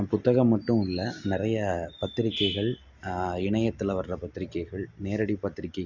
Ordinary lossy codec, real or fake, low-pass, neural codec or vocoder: none; real; 7.2 kHz; none